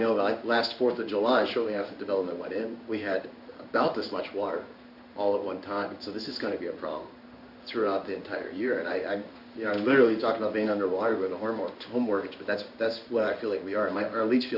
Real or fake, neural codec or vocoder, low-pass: fake; codec, 16 kHz in and 24 kHz out, 1 kbps, XY-Tokenizer; 5.4 kHz